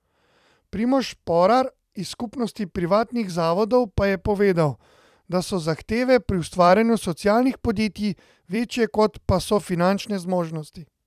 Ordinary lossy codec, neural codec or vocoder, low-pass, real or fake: none; vocoder, 44.1 kHz, 128 mel bands every 256 samples, BigVGAN v2; 14.4 kHz; fake